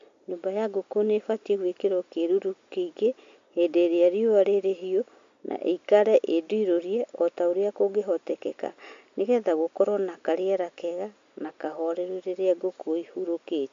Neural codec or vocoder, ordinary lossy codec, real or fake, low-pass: none; MP3, 48 kbps; real; 7.2 kHz